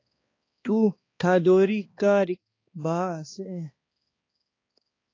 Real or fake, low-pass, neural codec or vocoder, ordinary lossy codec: fake; 7.2 kHz; codec, 16 kHz, 2 kbps, X-Codec, HuBERT features, trained on balanced general audio; AAC, 32 kbps